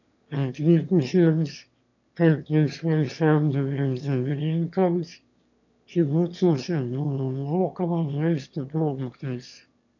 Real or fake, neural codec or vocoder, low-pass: fake; autoencoder, 22.05 kHz, a latent of 192 numbers a frame, VITS, trained on one speaker; 7.2 kHz